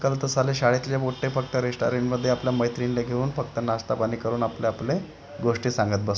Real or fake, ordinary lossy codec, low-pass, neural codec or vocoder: real; none; none; none